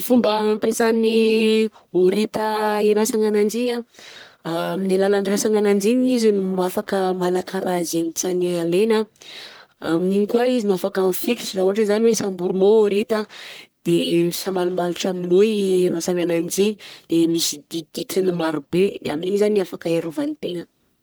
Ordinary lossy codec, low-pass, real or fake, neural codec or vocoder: none; none; fake; codec, 44.1 kHz, 1.7 kbps, Pupu-Codec